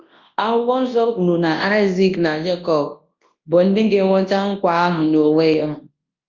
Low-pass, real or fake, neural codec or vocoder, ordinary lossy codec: 7.2 kHz; fake; codec, 24 kHz, 0.9 kbps, WavTokenizer, large speech release; Opus, 32 kbps